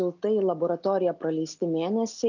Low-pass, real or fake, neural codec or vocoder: 7.2 kHz; real; none